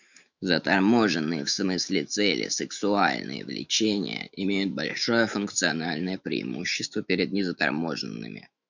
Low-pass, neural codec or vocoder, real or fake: 7.2 kHz; autoencoder, 48 kHz, 128 numbers a frame, DAC-VAE, trained on Japanese speech; fake